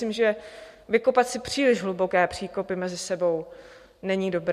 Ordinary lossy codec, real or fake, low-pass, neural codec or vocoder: MP3, 64 kbps; real; 14.4 kHz; none